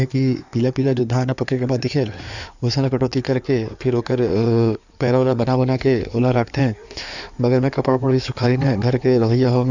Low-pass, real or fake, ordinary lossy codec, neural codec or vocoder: 7.2 kHz; fake; none; codec, 16 kHz in and 24 kHz out, 2.2 kbps, FireRedTTS-2 codec